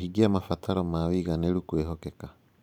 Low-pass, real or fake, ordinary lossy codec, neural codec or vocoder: 19.8 kHz; fake; none; vocoder, 44.1 kHz, 128 mel bands every 512 samples, BigVGAN v2